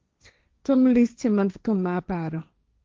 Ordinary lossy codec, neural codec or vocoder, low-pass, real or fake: Opus, 24 kbps; codec, 16 kHz, 1.1 kbps, Voila-Tokenizer; 7.2 kHz; fake